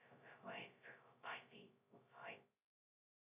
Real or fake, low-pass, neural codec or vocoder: fake; 3.6 kHz; codec, 16 kHz, 0.2 kbps, FocalCodec